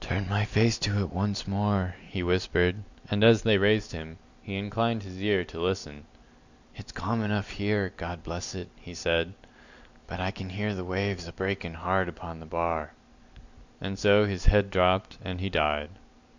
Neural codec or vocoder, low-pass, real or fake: none; 7.2 kHz; real